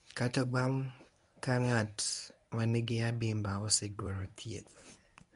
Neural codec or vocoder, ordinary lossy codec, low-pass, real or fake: codec, 24 kHz, 0.9 kbps, WavTokenizer, medium speech release version 1; none; 10.8 kHz; fake